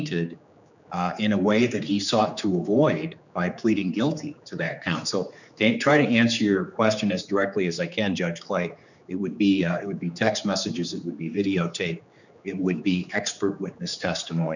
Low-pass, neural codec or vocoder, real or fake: 7.2 kHz; codec, 16 kHz, 4 kbps, X-Codec, HuBERT features, trained on general audio; fake